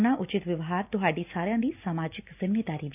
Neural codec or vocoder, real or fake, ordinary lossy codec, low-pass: none; real; none; 3.6 kHz